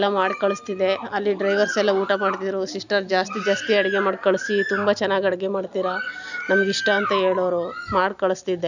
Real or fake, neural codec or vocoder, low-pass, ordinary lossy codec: real; none; 7.2 kHz; none